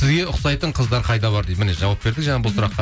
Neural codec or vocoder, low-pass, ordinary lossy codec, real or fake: none; none; none; real